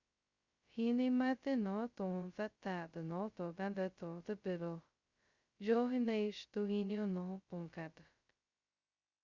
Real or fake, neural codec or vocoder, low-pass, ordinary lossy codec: fake; codec, 16 kHz, 0.2 kbps, FocalCodec; 7.2 kHz; Opus, 64 kbps